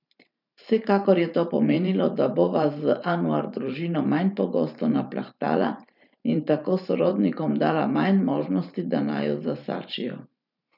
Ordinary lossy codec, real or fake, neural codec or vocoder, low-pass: none; fake; vocoder, 44.1 kHz, 128 mel bands every 512 samples, BigVGAN v2; 5.4 kHz